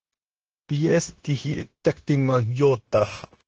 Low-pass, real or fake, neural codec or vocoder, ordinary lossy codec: 7.2 kHz; fake; codec, 16 kHz, 0.9 kbps, LongCat-Audio-Codec; Opus, 16 kbps